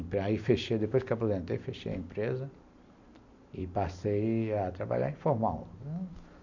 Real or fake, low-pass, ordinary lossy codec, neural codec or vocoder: real; 7.2 kHz; none; none